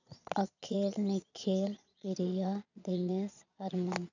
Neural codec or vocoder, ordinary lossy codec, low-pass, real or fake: vocoder, 22.05 kHz, 80 mel bands, Vocos; none; 7.2 kHz; fake